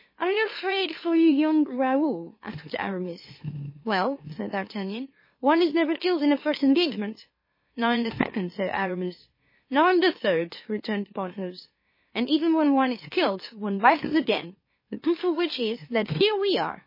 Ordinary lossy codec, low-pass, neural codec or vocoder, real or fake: MP3, 24 kbps; 5.4 kHz; autoencoder, 44.1 kHz, a latent of 192 numbers a frame, MeloTTS; fake